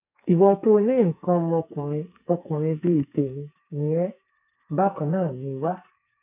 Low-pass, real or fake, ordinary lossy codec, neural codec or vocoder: 3.6 kHz; fake; none; codec, 44.1 kHz, 2.6 kbps, SNAC